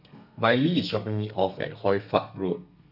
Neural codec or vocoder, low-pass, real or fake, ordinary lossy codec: codec, 32 kHz, 1.9 kbps, SNAC; 5.4 kHz; fake; none